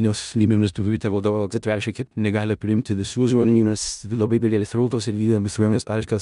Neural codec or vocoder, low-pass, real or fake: codec, 16 kHz in and 24 kHz out, 0.4 kbps, LongCat-Audio-Codec, four codebook decoder; 10.8 kHz; fake